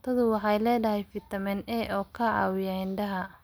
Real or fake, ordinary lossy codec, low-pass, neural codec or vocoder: real; none; none; none